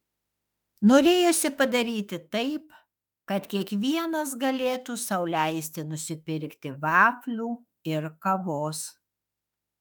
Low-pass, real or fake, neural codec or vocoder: 19.8 kHz; fake; autoencoder, 48 kHz, 32 numbers a frame, DAC-VAE, trained on Japanese speech